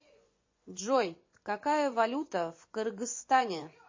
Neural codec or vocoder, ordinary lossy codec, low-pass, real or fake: vocoder, 24 kHz, 100 mel bands, Vocos; MP3, 32 kbps; 7.2 kHz; fake